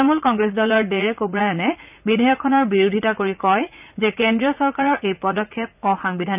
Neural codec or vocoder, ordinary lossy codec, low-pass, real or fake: vocoder, 44.1 kHz, 80 mel bands, Vocos; none; 3.6 kHz; fake